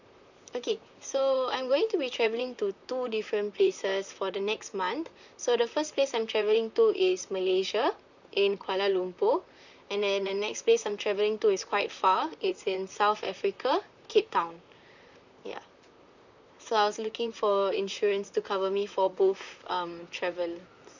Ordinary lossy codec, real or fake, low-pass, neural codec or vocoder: none; fake; 7.2 kHz; vocoder, 44.1 kHz, 128 mel bands, Pupu-Vocoder